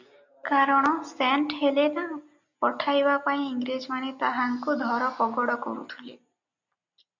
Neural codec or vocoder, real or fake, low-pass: none; real; 7.2 kHz